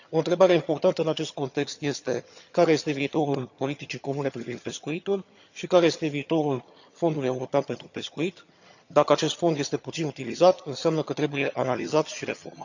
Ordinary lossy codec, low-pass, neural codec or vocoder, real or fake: none; 7.2 kHz; vocoder, 22.05 kHz, 80 mel bands, HiFi-GAN; fake